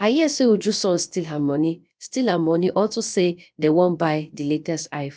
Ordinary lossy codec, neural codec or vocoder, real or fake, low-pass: none; codec, 16 kHz, about 1 kbps, DyCAST, with the encoder's durations; fake; none